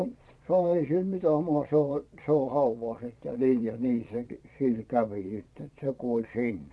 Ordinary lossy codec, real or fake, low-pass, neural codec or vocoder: none; fake; none; vocoder, 22.05 kHz, 80 mel bands, Vocos